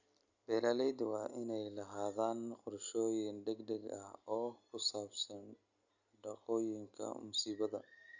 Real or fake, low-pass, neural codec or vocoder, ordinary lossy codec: real; 7.2 kHz; none; Opus, 64 kbps